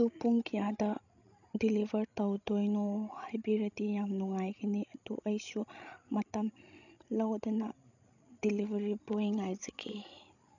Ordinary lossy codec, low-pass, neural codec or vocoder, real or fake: none; 7.2 kHz; codec, 16 kHz, 16 kbps, FreqCodec, larger model; fake